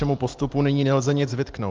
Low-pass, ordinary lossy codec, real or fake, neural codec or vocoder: 7.2 kHz; Opus, 24 kbps; real; none